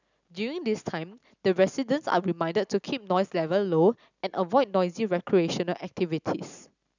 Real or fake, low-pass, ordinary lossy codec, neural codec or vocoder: real; 7.2 kHz; none; none